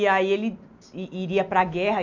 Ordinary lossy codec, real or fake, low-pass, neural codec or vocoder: none; real; 7.2 kHz; none